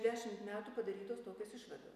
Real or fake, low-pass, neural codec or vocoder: real; 14.4 kHz; none